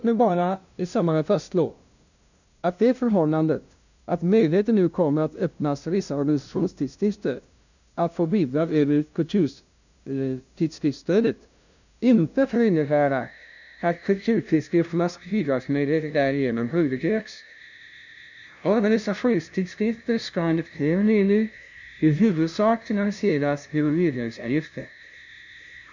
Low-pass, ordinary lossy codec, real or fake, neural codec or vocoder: 7.2 kHz; none; fake; codec, 16 kHz, 0.5 kbps, FunCodec, trained on LibriTTS, 25 frames a second